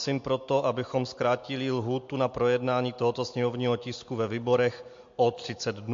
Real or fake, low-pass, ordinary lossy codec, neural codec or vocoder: real; 7.2 kHz; MP3, 48 kbps; none